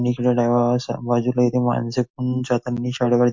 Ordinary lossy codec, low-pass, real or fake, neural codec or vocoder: MP3, 48 kbps; 7.2 kHz; real; none